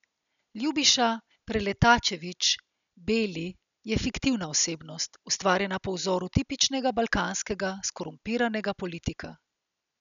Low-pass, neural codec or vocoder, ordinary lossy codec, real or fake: 7.2 kHz; none; none; real